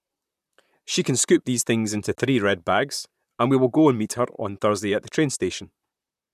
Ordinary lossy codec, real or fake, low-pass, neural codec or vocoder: none; fake; 14.4 kHz; vocoder, 44.1 kHz, 128 mel bands, Pupu-Vocoder